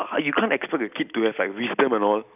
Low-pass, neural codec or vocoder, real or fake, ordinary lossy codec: 3.6 kHz; none; real; none